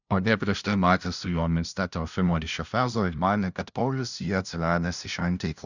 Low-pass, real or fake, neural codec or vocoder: 7.2 kHz; fake; codec, 16 kHz, 0.5 kbps, FunCodec, trained on LibriTTS, 25 frames a second